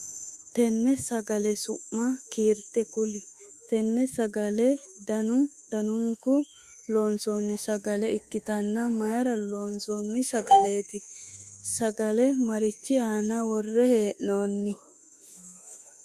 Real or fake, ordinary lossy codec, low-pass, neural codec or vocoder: fake; Opus, 64 kbps; 14.4 kHz; autoencoder, 48 kHz, 32 numbers a frame, DAC-VAE, trained on Japanese speech